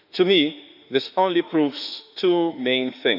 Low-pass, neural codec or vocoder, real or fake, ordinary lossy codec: 5.4 kHz; autoencoder, 48 kHz, 32 numbers a frame, DAC-VAE, trained on Japanese speech; fake; none